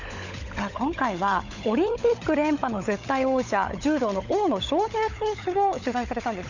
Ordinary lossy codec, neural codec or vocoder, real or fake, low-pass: none; codec, 16 kHz, 16 kbps, FunCodec, trained on LibriTTS, 50 frames a second; fake; 7.2 kHz